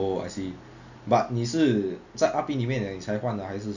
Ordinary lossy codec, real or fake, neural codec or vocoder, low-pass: none; real; none; 7.2 kHz